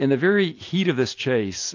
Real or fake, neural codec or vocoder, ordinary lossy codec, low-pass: real; none; AAC, 48 kbps; 7.2 kHz